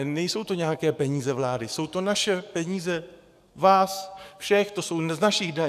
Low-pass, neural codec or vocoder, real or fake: 14.4 kHz; codec, 44.1 kHz, 7.8 kbps, DAC; fake